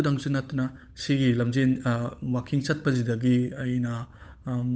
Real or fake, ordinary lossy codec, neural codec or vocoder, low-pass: fake; none; codec, 16 kHz, 8 kbps, FunCodec, trained on Chinese and English, 25 frames a second; none